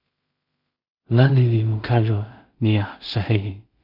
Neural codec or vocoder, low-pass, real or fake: codec, 16 kHz in and 24 kHz out, 0.4 kbps, LongCat-Audio-Codec, two codebook decoder; 5.4 kHz; fake